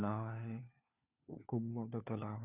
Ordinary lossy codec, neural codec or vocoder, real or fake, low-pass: none; codec, 16 kHz, 4 kbps, FunCodec, trained on LibriTTS, 50 frames a second; fake; 3.6 kHz